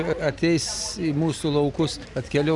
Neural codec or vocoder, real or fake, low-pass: none; real; 10.8 kHz